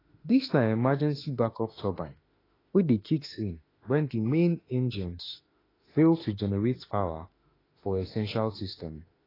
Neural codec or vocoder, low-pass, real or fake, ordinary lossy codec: autoencoder, 48 kHz, 32 numbers a frame, DAC-VAE, trained on Japanese speech; 5.4 kHz; fake; AAC, 24 kbps